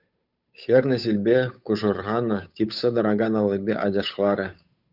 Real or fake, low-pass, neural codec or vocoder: fake; 5.4 kHz; codec, 16 kHz, 8 kbps, FunCodec, trained on Chinese and English, 25 frames a second